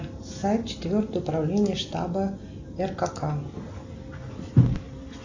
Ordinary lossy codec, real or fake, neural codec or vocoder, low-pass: AAC, 48 kbps; real; none; 7.2 kHz